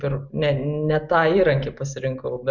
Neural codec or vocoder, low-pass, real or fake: none; 7.2 kHz; real